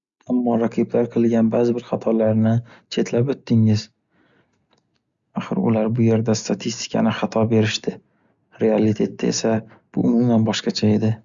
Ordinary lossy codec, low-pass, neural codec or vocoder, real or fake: Opus, 64 kbps; 7.2 kHz; none; real